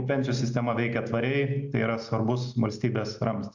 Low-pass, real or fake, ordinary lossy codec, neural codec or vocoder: 7.2 kHz; real; MP3, 64 kbps; none